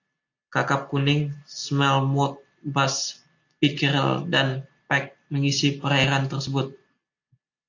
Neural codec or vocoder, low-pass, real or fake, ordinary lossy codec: none; 7.2 kHz; real; AAC, 48 kbps